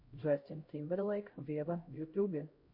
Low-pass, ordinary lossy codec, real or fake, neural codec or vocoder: 5.4 kHz; MP3, 32 kbps; fake; codec, 16 kHz, 0.5 kbps, X-Codec, HuBERT features, trained on LibriSpeech